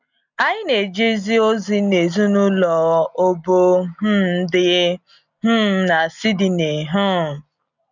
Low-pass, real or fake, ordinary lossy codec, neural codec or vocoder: 7.2 kHz; real; none; none